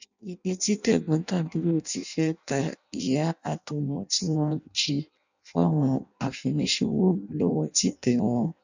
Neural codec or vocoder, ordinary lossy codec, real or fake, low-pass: codec, 16 kHz in and 24 kHz out, 0.6 kbps, FireRedTTS-2 codec; none; fake; 7.2 kHz